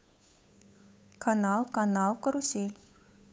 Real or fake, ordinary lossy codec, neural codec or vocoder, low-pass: fake; none; codec, 16 kHz, 8 kbps, FunCodec, trained on Chinese and English, 25 frames a second; none